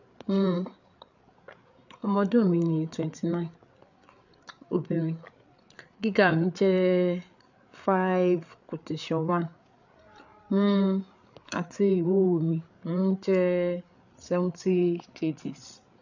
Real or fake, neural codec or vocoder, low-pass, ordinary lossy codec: fake; codec, 16 kHz, 8 kbps, FreqCodec, larger model; 7.2 kHz; none